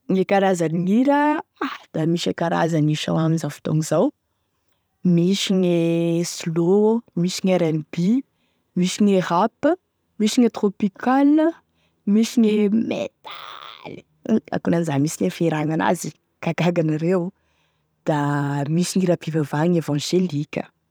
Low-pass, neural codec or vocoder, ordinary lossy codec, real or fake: none; vocoder, 44.1 kHz, 128 mel bands every 512 samples, BigVGAN v2; none; fake